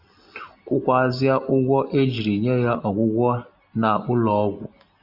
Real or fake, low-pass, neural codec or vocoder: real; 5.4 kHz; none